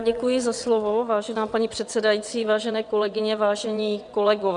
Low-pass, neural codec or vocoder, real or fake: 9.9 kHz; vocoder, 22.05 kHz, 80 mel bands, WaveNeXt; fake